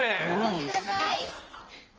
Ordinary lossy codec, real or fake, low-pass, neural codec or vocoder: Opus, 24 kbps; fake; 7.2 kHz; codec, 16 kHz in and 24 kHz out, 1.1 kbps, FireRedTTS-2 codec